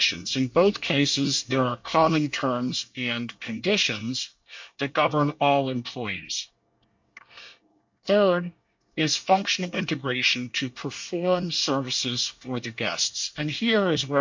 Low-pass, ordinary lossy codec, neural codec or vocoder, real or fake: 7.2 kHz; MP3, 48 kbps; codec, 24 kHz, 1 kbps, SNAC; fake